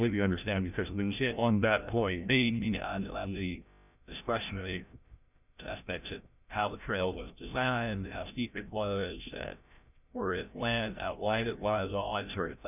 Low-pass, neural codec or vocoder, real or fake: 3.6 kHz; codec, 16 kHz, 0.5 kbps, FreqCodec, larger model; fake